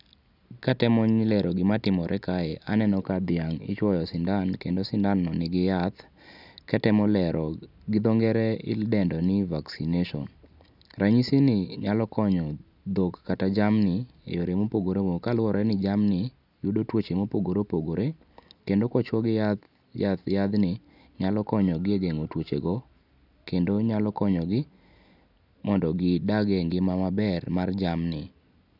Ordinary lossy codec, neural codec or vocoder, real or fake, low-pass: none; none; real; 5.4 kHz